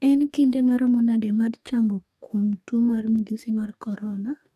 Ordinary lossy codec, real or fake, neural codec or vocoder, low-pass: none; fake; codec, 44.1 kHz, 2.6 kbps, DAC; 14.4 kHz